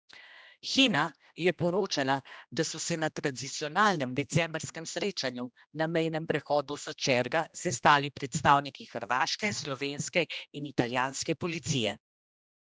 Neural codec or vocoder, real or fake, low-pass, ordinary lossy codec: codec, 16 kHz, 1 kbps, X-Codec, HuBERT features, trained on general audio; fake; none; none